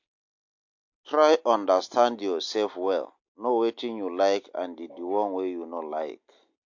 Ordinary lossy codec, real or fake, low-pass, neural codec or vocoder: MP3, 48 kbps; real; 7.2 kHz; none